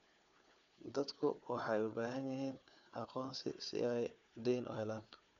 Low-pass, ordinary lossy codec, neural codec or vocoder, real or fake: 7.2 kHz; MP3, 64 kbps; codec, 16 kHz, 4 kbps, FunCodec, trained on Chinese and English, 50 frames a second; fake